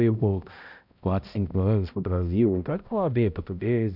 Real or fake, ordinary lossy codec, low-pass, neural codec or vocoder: fake; none; 5.4 kHz; codec, 16 kHz, 0.5 kbps, X-Codec, HuBERT features, trained on balanced general audio